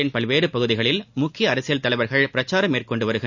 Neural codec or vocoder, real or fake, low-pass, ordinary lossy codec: none; real; 7.2 kHz; none